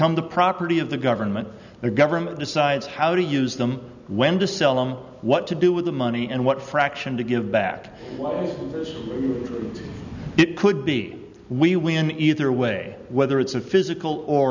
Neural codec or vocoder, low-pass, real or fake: none; 7.2 kHz; real